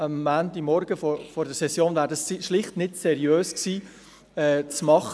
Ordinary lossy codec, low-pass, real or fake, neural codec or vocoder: none; none; real; none